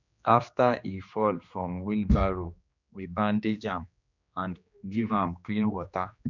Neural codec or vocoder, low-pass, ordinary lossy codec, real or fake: codec, 16 kHz, 2 kbps, X-Codec, HuBERT features, trained on general audio; 7.2 kHz; none; fake